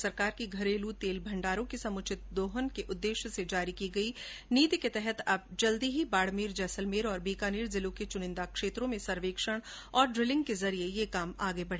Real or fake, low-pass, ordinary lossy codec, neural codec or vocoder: real; none; none; none